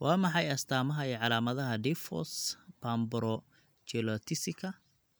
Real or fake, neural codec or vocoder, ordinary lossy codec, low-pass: real; none; none; none